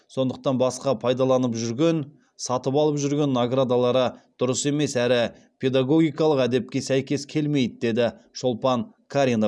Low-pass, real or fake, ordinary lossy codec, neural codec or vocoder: none; real; none; none